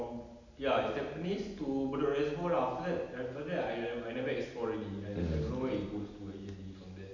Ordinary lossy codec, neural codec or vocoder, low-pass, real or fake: none; none; 7.2 kHz; real